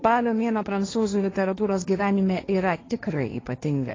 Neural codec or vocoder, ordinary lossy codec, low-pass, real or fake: codec, 16 kHz, 1.1 kbps, Voila-Tokenizer; AAC, 32 kbps; 7.2 kHz; fake